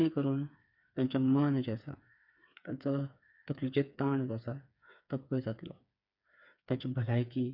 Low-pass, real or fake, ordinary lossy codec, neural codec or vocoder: 5.4 kHz; fake; none; codec, 16 kHz, 4 kbps, FreqCodec, smaller model